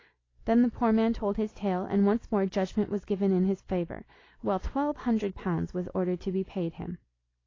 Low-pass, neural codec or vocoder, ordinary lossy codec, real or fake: 7.2 kHz; none; AAC, 32 kbps; real